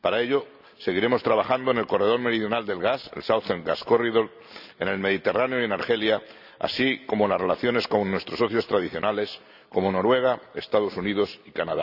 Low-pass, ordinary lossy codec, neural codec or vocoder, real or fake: 5.4 kHz; none; none; real